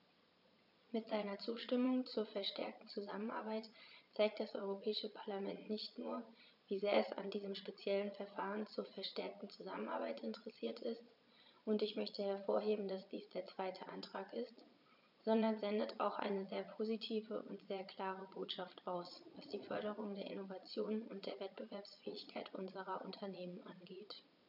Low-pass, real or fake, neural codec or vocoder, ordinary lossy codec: 5.4 kHz; fake; vocoder, 22.05 kHz, 80 mel bands, Vocos; none